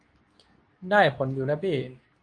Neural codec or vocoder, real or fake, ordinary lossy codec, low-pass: codec, 24 kHz, 0.9 kbps, WavTokenizer, medium speech release version 2; fake; AAC, 48 kbps; 9.9 kHz